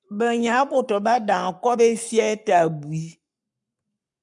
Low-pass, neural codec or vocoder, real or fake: 10.8 kHz; codec, 44.1 kHz, 7.8 kbps, Pupu-Codec; fake